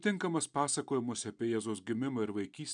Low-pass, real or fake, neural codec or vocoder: 9.9 kHz; real; none